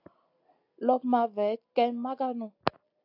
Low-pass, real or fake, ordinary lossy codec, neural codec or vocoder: 5.4 kHz; real; AAC, 48 kbps; none